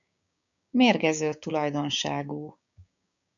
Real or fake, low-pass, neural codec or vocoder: fake; 7.2 kHz; codec, 16 kHz, 6 kbps, DAC